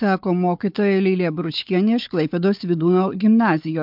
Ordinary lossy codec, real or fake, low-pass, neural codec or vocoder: MP3, 48 kbps; fake; 5.4 kHz; codec, 16 kHz, 4 kbps, FunCodec, trained on Chinese and English, 50 frames a second